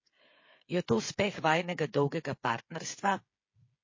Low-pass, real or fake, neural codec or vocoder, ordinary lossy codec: 7.2 kHz; fake; codec, 16 kHz, 8 kbps, FreqCodec, smaller model; MP3, 32 kbps